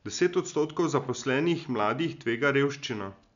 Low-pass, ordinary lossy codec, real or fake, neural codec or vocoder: 7.2 kHz; none; real; none